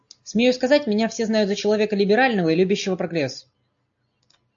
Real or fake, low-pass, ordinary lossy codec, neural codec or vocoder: real; 7.2 kHz; AAC, 64 kbps; none